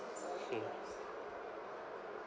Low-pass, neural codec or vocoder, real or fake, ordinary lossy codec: none; none; real; none